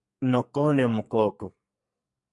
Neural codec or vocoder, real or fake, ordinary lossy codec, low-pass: codec, 44.1 kHz, 2.6 kbps, SNAC; fake; MP3, 64 kbps; 10.8 kHz